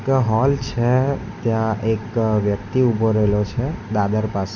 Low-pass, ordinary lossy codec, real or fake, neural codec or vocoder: 7.2 kHz; none; real; none